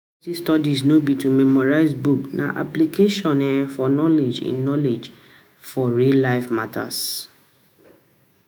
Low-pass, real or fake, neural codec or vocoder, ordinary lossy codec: none; fake; autoencoder, 48 kHz, 128 numbers a frame, DAC-VAE, trained on Japanese speech; none